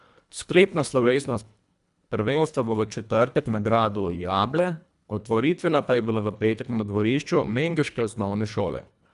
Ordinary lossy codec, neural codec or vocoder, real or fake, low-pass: none; codec, 24 kHz, 1.5 kbps, HILCodec; fake; 10.8 kHz